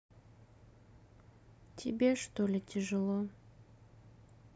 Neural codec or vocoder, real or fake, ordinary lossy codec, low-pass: none; real; none; none